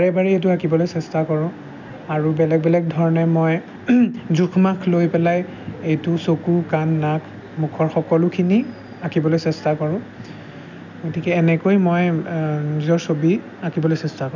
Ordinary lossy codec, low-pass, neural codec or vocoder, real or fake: none; 7.2 kHz; none; real